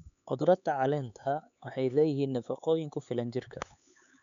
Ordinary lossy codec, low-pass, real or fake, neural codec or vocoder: none; 7.2 kHz; fake; codec, 16 kHz, 4 kbps, X-Codec, HuBERT features, trained on LibriSpeech